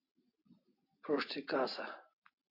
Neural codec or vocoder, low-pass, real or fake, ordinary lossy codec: none; 5.4 kHz; real; AAC, 32 kbps